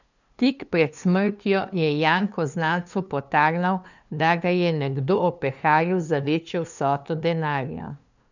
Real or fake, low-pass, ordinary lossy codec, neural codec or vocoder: fake; 7.2 kHz; none; codec, 16 kHz, 2 kbps, FunCodec, trained on LibriTTS, 25 frames a second